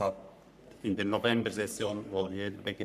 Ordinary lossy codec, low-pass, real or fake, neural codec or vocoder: none; 14.4 kHz; fake; codec, 44.1 kHz, 3.4 kbps, Pupu-Codec